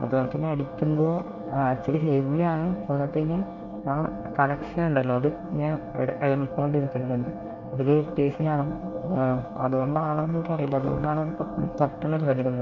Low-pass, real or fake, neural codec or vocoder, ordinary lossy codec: 7.2 kHz; fake; codec, 24 kHz, 1 kbps, SNAC; MP3, 48 kbps